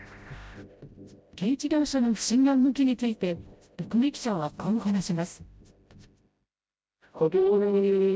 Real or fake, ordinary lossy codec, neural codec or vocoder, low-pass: fake; none; codec, 16 kHz, 0.5 kbps, FreqCodec, smaller model; none